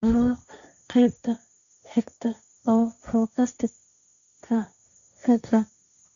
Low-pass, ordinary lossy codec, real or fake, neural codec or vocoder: 7.2 kHz; none; fake; codec, 16 kHz, 1.1 kbps, Voila-Tokenizer